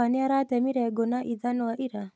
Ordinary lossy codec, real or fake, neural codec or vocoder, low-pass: none; real; none; none